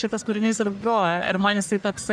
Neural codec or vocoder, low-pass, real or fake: codec, 44.1 kHz, 1.7 kbps, Pupu-Codec; 9.9 kHz; fake